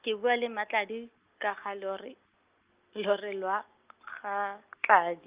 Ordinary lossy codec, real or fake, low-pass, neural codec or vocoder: Opus, 24 kbps; real; 3.6 kHz; none